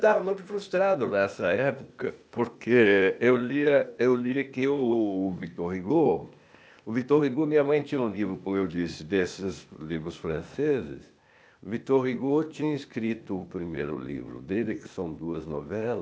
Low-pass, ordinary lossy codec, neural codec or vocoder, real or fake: none; none; codec, 16 kHz, 0.8 kbps, ZipCodec; fake